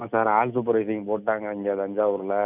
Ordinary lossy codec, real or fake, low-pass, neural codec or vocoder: none; real; 3.6 kHz; none